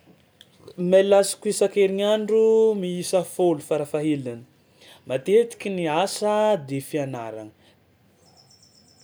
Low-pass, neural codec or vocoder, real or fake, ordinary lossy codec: none; none; real; none